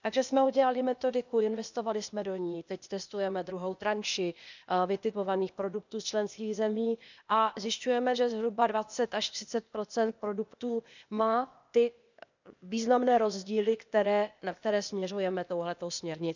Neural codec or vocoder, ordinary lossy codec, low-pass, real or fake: codec, 16 kHz, 0.8 kbps, ZipCodec; none; 7.2 kHz; fake